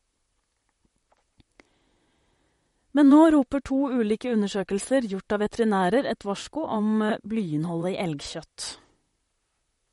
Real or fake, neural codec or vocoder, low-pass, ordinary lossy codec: fake; vocoder, 44.1 kHz, 128 mel bands, Pupu-Vocoder; 19.8 kHz; MP3, 48 kbps